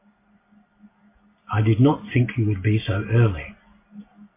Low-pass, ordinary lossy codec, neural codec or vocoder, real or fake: 3.6 kHz; MP3, 24 kbps; none; real